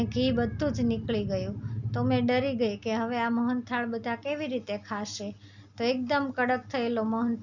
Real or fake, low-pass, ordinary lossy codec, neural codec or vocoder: real; 7.2 kHz; none; none